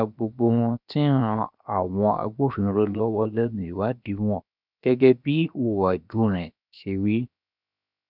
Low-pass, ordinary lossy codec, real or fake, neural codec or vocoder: 5.4 kHz; none; fake; codec, 16 kHz, 0.7 kbps, FocalCodec